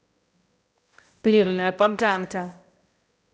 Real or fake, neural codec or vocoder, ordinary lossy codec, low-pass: fake; codec, 16 kHz, 0.5 kbps, X-Codec, HuBERT features, trained on balanced general audio; none; none